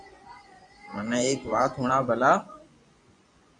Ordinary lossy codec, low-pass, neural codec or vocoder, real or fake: MP3, 64 kbps; 10.8 kHz; vocoder, 24 kHz, 100 mel bands, Vocos; fake